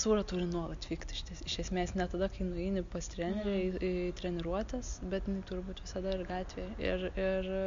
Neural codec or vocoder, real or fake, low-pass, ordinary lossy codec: none; real; 7.2 kHz; AAC, 64 kbps